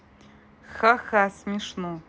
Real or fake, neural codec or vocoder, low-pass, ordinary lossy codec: real; none; none; none